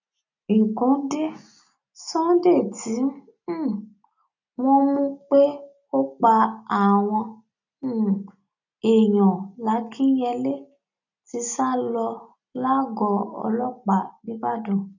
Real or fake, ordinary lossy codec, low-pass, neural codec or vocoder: real; none; 7.2 kHz; none